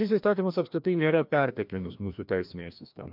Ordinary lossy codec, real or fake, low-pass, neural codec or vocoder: MP3, 48 kbps; fake; 5.4 kHz; codec, 16 kHz, 1 kbps, FreqCodec, larger model